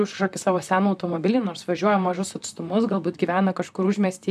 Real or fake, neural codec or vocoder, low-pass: fake; vocoder, 44.1 kHz, 128 mel bands, Pupu-Vocoder; 14.4 kHz